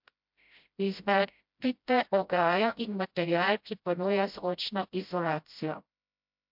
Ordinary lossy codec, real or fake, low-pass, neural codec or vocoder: MP3, 48 kbps; fake; 5.4 kHz; codec, 16 kHz, 0.5 kbps, FreqCodec, smaller model